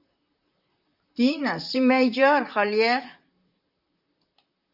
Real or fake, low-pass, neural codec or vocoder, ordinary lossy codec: fake; 5.4 kHz; vocoder, 44.1 kHz, 128 mel bands, Pupu-Vocoder; Opus, 64 kbps